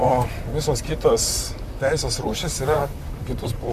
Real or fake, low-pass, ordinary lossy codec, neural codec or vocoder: fake; 14.4 kHz; MP3, 96 kbps; vocoder, 44.1 kHz, 128 mel bands, Pupu-Vocoder